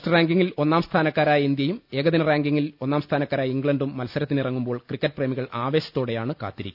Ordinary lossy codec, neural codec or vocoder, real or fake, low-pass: none; none; real; 5.4 kHz